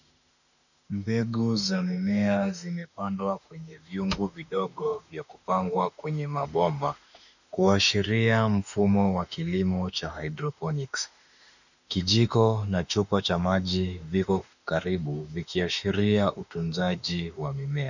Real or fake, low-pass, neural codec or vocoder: fake; 7.2 kHz; autoencoder, 48 kHz, 32 numbers a frame, DAC-VAE, trained on Japanese speech